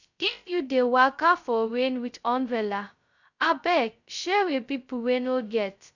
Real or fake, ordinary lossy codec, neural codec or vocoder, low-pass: fake; none; codec, 16 kHz, 0.2 kbps, FocalCodec; 7.2 kHz